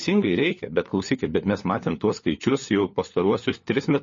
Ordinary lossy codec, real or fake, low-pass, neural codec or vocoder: MP3, 32 kbps; fake; 7.2 kHz; codec, 16 kHz, 4 kbps, FunCodec, trained on LibriTTS, 50 frames a second